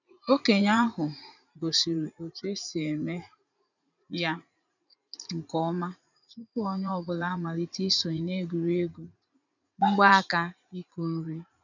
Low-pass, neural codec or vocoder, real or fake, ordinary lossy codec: 7.2 kHz; vocoder, 44.1 kHz, 80 mel bands, Vocos; fake; none